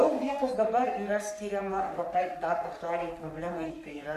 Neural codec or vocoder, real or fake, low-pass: codec, 44.1 kHz, 3.4 kbps, Pupu-Codec; fake; 14.4 kHz